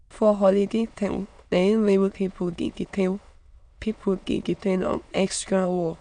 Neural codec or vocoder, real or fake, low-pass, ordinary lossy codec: autoencoder, 22.05 kHz, a latent of 192 numbers a frame, VITS, trained on many speakers; fake; 9.9 kHz; none